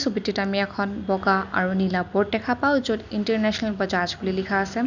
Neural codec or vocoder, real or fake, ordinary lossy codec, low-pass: none; real; none; 7.2 kHz